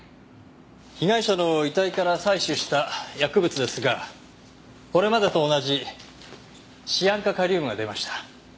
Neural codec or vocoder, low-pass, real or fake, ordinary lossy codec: none; none; real; none